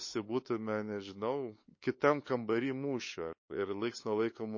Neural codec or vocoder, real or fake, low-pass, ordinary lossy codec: codec, 16 kHz, 8 kbps, FunCodec, trained on LibriTTS, 25 frames a second; fake; 7.2 kHz; MP3, 32 kbps